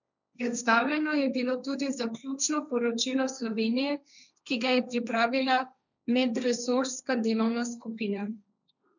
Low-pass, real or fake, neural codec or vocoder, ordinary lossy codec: 7.2 kHz; fake; codec, 16 kHz, 1.1 kbps, Voila-Tokenizer; none